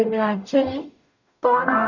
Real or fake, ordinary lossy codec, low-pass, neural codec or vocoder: fake; none; 7.2 kHz; codec, 44.1 kHz, 0.9 kbps, DAC